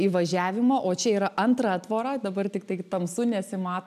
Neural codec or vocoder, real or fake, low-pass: none; real; 14.4 kHz